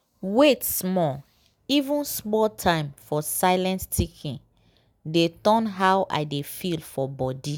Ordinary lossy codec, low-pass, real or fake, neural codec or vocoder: none; none; real; none